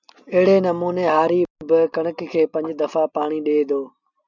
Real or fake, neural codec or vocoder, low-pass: real; none; 7.2 kHz